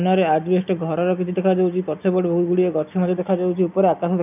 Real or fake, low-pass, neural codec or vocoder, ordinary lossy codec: real; 3.6 kHz; none; none